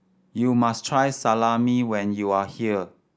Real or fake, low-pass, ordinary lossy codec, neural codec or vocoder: real; none; none; none